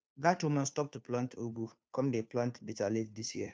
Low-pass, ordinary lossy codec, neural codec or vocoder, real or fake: none; none; codec, 16 kHz, 2 kbps, FunCodec, trained on Chinese and English, 25 frames a second; fake